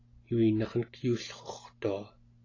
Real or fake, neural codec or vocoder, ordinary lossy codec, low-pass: real; none; AAC, 32 kbps; 7.2 kHz